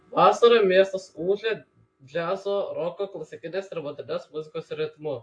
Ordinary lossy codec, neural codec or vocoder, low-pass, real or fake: AAC, 48 kbps; none; 9.9 kHz; real